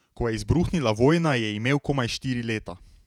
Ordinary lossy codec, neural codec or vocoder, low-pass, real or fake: none; vocoder, 48 kHz, 128 mel bands, Vocos; 19.8 kHz; fake